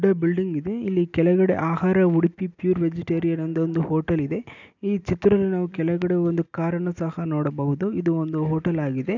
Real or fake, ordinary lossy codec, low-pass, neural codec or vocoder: real; none; 7.2 kHz; none